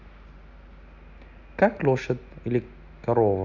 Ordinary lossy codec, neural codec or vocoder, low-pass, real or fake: none; none; 7.2 kHz; real